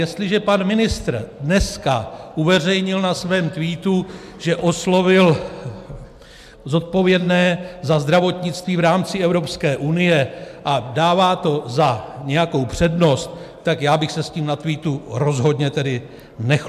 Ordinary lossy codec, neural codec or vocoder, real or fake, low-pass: MP3, 96 kbps; none; real; 14.4 kHz